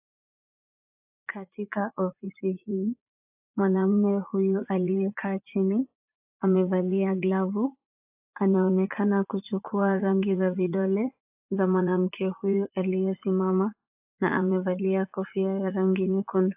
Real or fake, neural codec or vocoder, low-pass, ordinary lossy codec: fake; vocoder, 44.1 kHz, 80 mel bands, Vocos; 3.6 kHz; AAC, 32 kbps